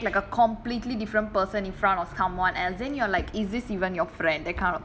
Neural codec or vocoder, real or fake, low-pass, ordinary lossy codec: none; real; none; none